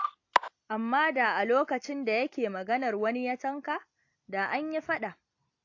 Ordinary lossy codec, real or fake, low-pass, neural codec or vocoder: AAC, 48 kbps; real; 7.2 kHz; none